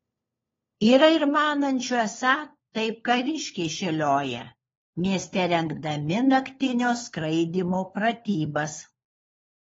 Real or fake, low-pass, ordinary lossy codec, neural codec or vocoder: fake; 7.2 kHz; AAC, 24 kbps; codec, 16 kHz, 4 kbps, FunCodec, trained on LibriTTS, 50 frames a second